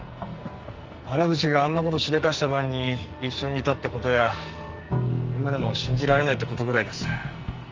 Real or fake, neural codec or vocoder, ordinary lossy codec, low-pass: fake; codec, 44.1 kHz, 2.6 kbps, SNAC; Opus, 32 kbps; 7.2 kHz